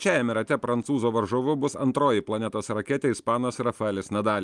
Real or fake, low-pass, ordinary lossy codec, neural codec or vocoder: real; 10.8 kHz; Opus, 32 kbps; none